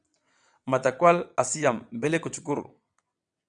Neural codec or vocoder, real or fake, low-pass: vocoder, 22.05 kHz, 80 mel bands, WaveNeXt; fake; 9.9 kHz